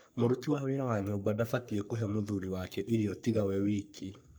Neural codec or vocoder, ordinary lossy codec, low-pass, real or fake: codec, 44.1 kHz, 3.4 kbps, Pupu-Codec; none; none; fake